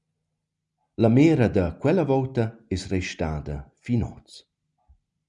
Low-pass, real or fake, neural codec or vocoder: 10.8 kHz; real; none